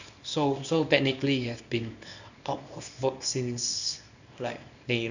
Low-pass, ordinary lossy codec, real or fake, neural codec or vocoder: 7.2 kHz; none; fake; codec, 24 kHz, 0.9 kbps, WavTokenizer, small release